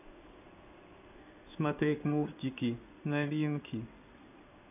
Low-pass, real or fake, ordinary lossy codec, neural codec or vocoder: 3.6 kHz; fake; none; codec, 16 kHz in and 24 kHz out, 1 kbps, XY-Tokenizer